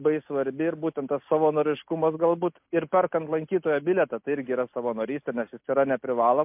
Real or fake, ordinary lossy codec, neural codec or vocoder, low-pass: real; MP3, 32 kbps; none; 3.6 kHz